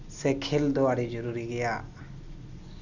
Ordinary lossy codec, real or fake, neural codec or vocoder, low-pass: none; real; none; 7.2 kHz